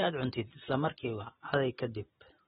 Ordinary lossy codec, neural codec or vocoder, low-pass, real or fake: AAC, 16 kbps; none; 19.8 kHz; real